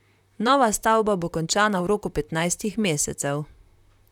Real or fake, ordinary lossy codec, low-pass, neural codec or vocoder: fake; none; 19.8 kHz; vocoder, 44.1 kHz, 128 mel bands, Pupu-Vocoder